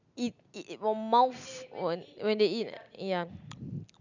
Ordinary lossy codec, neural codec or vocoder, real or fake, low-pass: none; none; real; 7.2 kHz